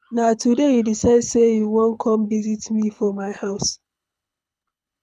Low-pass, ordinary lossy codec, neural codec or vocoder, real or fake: none; none; codec, 24 kHz, 6 kbps, HILCodec; fake